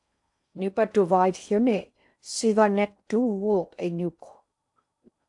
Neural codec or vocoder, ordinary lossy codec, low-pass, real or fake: codec, 16 kHz in and 24 kHz out, 0.6 kbps, FocalCodec, streaming, 4096 codes; AAC, 64 kbps; 10.8 kHz; fake